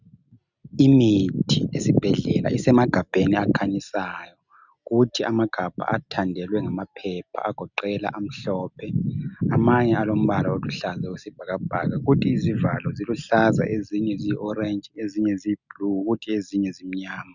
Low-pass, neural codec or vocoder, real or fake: 7.2 kHz; none; real